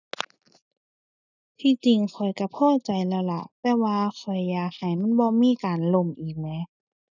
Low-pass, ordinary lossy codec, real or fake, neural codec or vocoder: 7.2 kHz; none; real; none